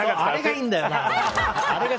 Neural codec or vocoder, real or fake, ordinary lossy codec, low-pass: none; real; none; none